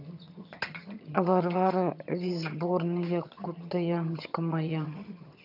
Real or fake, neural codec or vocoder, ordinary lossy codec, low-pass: fake; vocoder, 22.05 kHz, 80 mel bands, HiFi-GAN; none; 5.4 kHz